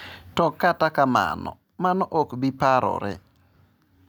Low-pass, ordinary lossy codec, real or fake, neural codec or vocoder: none; none; real; none